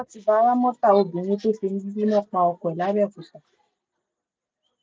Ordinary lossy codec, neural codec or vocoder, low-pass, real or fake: none; none; none; real